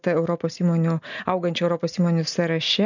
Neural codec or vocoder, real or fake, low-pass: none; real; 7.2 kHz